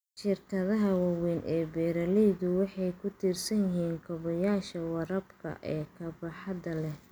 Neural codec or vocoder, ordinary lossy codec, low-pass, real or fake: none; none; none; real